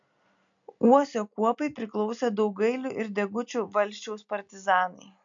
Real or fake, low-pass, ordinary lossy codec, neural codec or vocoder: real; 7.2 kHz; MP3, 64 kbps; none